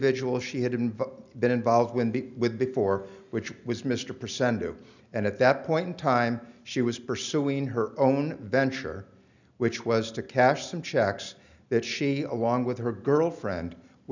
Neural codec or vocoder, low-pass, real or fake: none; 7.2 kHz; real